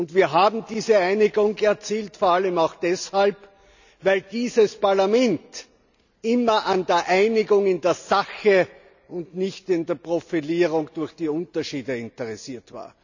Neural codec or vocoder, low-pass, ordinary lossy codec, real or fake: none; 7.2 kHz; none; real